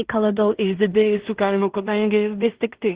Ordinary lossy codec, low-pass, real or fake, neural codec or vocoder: Opus, 16 kbps; 3.6 kHz; fake; codec, 16 kHz in and 24 kHz out, 0.4 kbps, LongCat-Audio-Codec, two codebook decoder